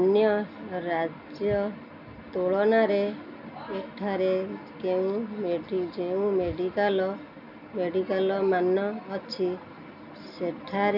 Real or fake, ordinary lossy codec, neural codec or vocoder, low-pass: real; none; none; 5.4 kHz